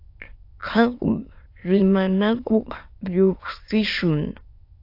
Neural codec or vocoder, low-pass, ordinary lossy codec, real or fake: autoencoder, 22.05 kHz, a latent of 192 numbers a frame, VITS, trained on many speakers; 5.4 kHz; AAC, 32 kbps; fake